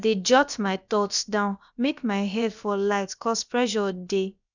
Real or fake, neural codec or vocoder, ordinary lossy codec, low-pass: fake; codec, 16 kHz, about 1 kbps, DyCAST, with the encoder's durations; none; 7.2 kHz